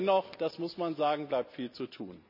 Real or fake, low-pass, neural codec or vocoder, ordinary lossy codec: real; 5.4 kHz; none; none